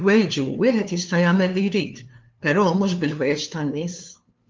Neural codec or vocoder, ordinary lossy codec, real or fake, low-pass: codec, 16 kHz, 2 kbps, FunCodec, trained on LibriTTS, 25 frames a second; Opus, 32 kbps; fake; 7.2 kHz